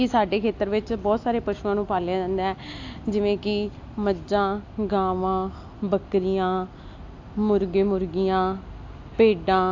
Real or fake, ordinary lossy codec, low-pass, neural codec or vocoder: real; AAC, 48 kbps; 7.2 kHz; none